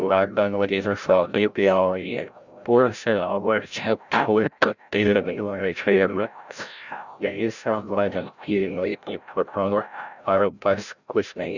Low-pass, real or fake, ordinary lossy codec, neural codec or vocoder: 7.2 kHz; fake; none; codec, 16 kHz, 0.5 kbps, FreqCodec, larger model